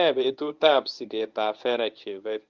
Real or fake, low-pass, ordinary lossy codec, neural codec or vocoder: fake; 7.2 kHz; Opus, 24 kbps; codec, 24 kHz, 0.9 kbps, WavTokenizer, medium speech release version 1